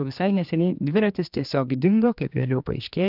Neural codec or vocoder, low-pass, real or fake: codec, 16 kHz, 2 kbps, X-Codec, HuBERT features, trained on general audio; 5.4 kHz; fake